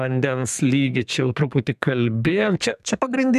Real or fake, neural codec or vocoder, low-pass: fake; codec, 32 kHz, 1.9 kbps, SNAC; 14.4 kHz